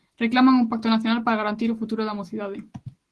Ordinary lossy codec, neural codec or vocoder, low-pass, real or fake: Opus, 16 kbps; none; 10.8 kHz; real